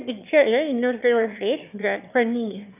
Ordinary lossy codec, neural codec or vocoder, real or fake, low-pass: none; autoencoder, 22.05 kHz, a latent of 192 numbers a frame, VITS, trained on one speaker; fake; 3.6 kHz